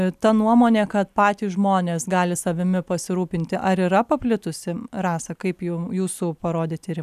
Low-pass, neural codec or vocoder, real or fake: 14.4 kHz; none; real